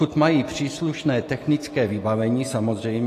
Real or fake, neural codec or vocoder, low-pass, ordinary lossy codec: real; none; 14.4 kHz; AAC, 48 kbps